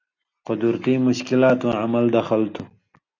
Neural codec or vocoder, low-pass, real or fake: none; 7.2 kHz; real